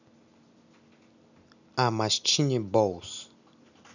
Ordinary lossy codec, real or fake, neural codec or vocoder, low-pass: none; real; none; 7.2 kHz